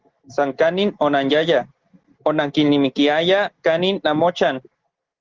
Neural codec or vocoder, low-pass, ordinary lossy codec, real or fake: none; 7.2 kHz; Opus, 16 kbps; real